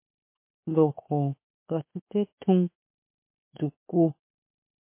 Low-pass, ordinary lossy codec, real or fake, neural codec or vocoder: 3.6 kHz; MP3, 24 kbps; fake; autoencoder, 48 kHz, 32 numbers a frame, DAC-VAE, trained on Japanese speech